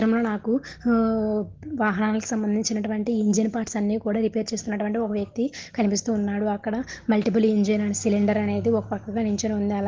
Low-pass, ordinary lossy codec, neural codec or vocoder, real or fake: 7.2 kHz; Opus, 16 kbps; none; real